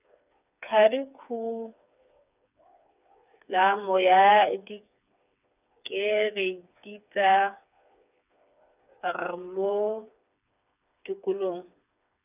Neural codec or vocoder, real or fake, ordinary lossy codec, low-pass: codec, 16 kHz, 4 kbps, FreqCodec, smaller model; fake; none; 3.6 kHz